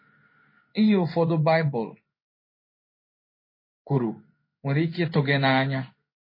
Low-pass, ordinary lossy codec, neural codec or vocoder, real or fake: 5.4 kHz; MP3, 24 kbps; codec, 16 kHz in and 24 kHz out, 1 kbps, XY-Tokenizer; fake